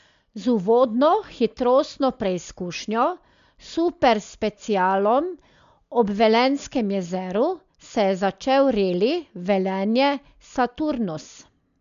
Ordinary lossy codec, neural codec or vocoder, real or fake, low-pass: MP3, 64 kbps; none; real; 7.2 kHz